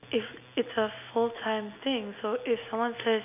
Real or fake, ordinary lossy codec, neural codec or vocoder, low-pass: real; none; none; 3.6 kHz